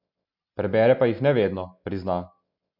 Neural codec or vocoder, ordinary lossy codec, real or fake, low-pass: none; none; real; 5.4 kHz